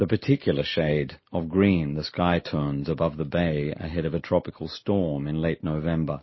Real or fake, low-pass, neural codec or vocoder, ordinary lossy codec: real; 7.2 kHz; none; MP3, 24 kbps